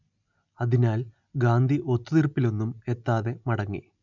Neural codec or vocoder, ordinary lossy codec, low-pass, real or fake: none; none; 7.2 kHz; real